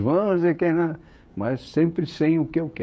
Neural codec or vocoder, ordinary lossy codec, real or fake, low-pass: codec, 16 kHz, 16 kbps, FreqCodec, smaller model; none; fake; none